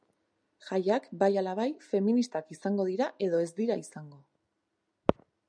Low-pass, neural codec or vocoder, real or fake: 9.9 kHz; none; real